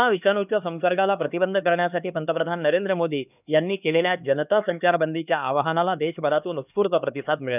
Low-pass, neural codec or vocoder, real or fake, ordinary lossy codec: 3.6 kHz; codec, 16 kHz, 2 kbps, X-Codec, HuBERT features, trained on LibriSpeech; fake; none